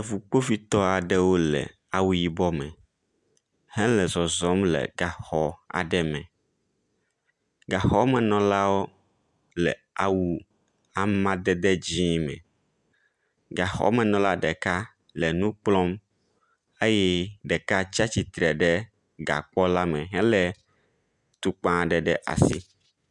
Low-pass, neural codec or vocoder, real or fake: 10.8 kHz; none; real